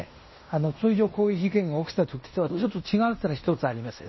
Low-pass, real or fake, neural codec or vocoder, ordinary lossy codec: 7.2 kHz; fake; codec, 16 kHz in and 24 kHz out, 0.9 kbps, LongCat-Audio-Codec, fine tuned four codebook decoder; MP3, 24 kbps